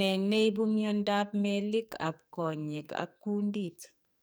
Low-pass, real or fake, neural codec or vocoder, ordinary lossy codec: none; fake; codec, 44.1 kHz, 2.6 kbps, SNAC; none